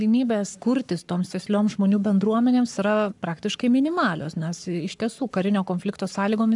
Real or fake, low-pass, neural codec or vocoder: fake; 10.8 kHz; codec, 44.1 kHz, 7.8 kbps, Pupu-Codec